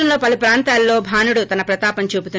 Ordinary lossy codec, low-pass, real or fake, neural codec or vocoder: none; none; real; none